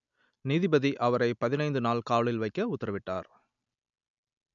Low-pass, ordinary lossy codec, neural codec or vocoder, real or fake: 7.2 kHz; none; none; real